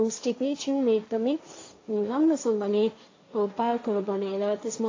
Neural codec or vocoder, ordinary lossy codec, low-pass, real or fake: codec, 16 kHz, 1.1 kbps, Voila-Tokenizer; AAC, 32 kbps; 7.2 kHz; fake